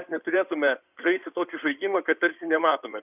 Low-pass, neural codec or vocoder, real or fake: 3.6 kHz; codec, 16 kHz in and 24 kHz out, 2.2 kbps, FireRedTTS-2 codec; fake